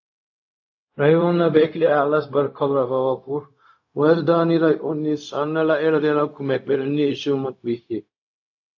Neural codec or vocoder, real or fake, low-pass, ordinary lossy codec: codec, 16 kHz, 0.4 kbps, LongCat-Audio-Codec; fake; 7.2 kHz; AAC, 48 kbps